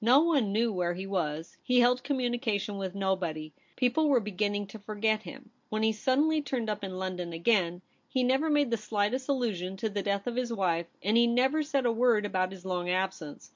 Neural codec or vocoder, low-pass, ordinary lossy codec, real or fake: none; 7.2 kHz; MP3, 48 kbps; real